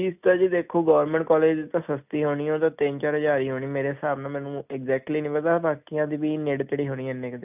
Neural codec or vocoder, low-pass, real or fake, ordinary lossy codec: none; 3.6 kHz; real; none